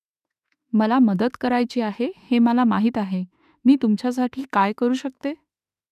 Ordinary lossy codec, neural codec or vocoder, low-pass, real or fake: none; autoencoder, 48 kHz, 32 numbers a frame, DAC-VAE, trained on Japanese speech; 14.4 kHz; fake